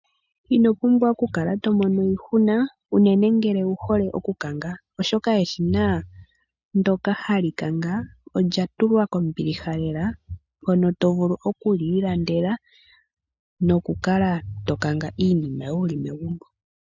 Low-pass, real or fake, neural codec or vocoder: 7.2 kHz; real; none